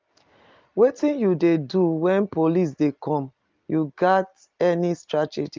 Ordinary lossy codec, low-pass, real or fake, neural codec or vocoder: Opus, 24 kbps; 7.2 kHz; real; none